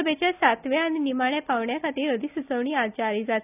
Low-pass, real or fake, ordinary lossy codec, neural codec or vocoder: 3.6 kHz; real; none; none